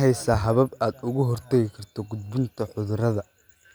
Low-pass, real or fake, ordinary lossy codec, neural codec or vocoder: none; real; none; none